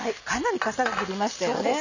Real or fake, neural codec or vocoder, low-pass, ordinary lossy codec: real; none; 7.2 kHz; none